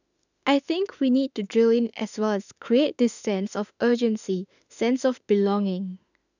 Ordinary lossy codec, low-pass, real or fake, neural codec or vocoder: none; 7.2 kHz; fake; autoencoder, 48 kHz, 32 numbers a frame, DAC-VAE, trained on Japanese speech